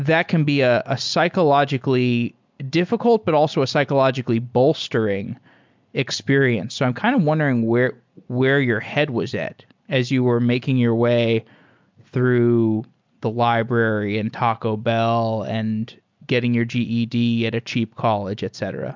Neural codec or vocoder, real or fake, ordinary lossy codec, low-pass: none; real; MP3, 64 kbps; 7.2 kHz